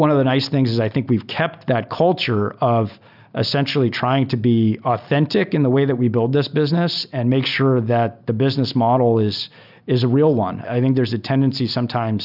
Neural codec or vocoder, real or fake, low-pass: none; real; 5.4 kHz